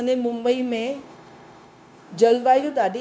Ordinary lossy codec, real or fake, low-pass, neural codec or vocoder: none; fake; none; codec, 16 kHz, 0.9 kbps, LongCat-Audio-Codec